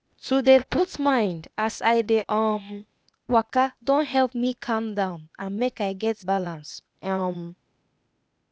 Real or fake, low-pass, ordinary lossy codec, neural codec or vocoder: fake; none; none; codec, 16 kHz, 0.8 kbps, ZipCodec